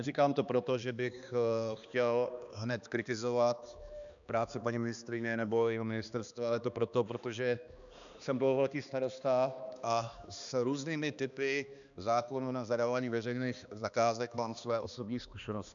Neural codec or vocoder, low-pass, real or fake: codec, 16 kHz, 2 kbps, X-Codec, HuBERT features, trained on balanced general audio; 7.2 kHz; fake